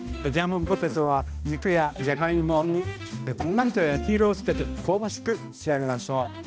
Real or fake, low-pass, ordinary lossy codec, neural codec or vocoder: fake; none; none; codec, 16 kHz, 1 kbps, X-Codec, HuBERT features, trained on balanced general audio